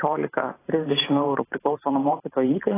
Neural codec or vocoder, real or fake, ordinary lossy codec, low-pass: vocoder, 44.1 kHz, 128 mel bands every 512 samples, BigVGAN v2; fake; AAC, 16 kbps; 3.6 kHz